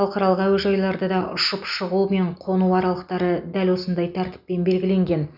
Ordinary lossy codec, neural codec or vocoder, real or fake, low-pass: none; none; real; 5.4 kHz